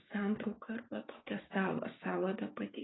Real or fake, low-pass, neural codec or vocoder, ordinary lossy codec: fake; 7.2 kHz; codec, 16 kHz, 6 kbps, DAC; AAC, 16 kbps